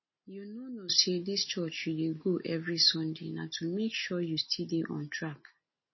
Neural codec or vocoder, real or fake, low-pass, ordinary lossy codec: none; real; 7.2 kHz; MP3, 24 kbps